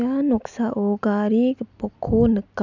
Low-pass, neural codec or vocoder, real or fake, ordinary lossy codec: 7.2 kHz; none; real; none